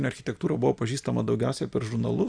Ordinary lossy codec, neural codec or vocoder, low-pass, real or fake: Opus, 64 kbps; none; 9.9 kHz; real